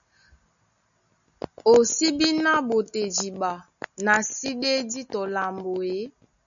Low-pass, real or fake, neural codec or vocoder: 7.2 kHz; real; none